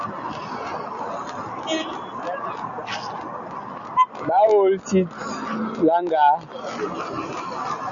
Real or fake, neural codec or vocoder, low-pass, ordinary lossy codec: real; none; 7.2 kHz; MP3, 96 kbps